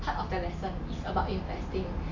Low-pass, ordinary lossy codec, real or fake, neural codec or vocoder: 7.2 kHz; AAC, 48 kbps; real; none